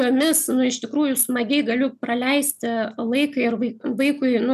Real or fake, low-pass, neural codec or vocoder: real; 14.4 kHz; none